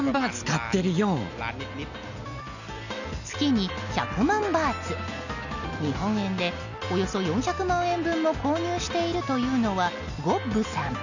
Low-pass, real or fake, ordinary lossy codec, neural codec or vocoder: 7.2 kHz; real; none; none